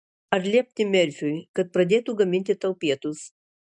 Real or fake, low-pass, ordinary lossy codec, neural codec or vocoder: real; 10.8 kHz; MP3, 96 kbps; none